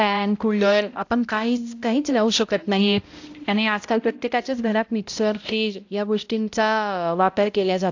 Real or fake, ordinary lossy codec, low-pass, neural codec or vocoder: fake; AAC, 48 kbps; 7.2 kHz; codec, 16 kHz, 0.5 kbps, X-Codec, HuBERT features, trained on balanced general audio